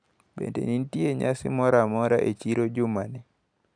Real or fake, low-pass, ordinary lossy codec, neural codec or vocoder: real; 9.9 kHz; none; none